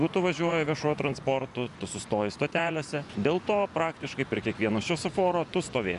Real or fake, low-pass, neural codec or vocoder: fake; 10.8 kHz; vocoder, 24 kHz, 100 mel bands, Vocos